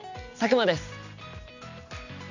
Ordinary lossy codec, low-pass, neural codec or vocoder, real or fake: none; 7.2 kHz; none; real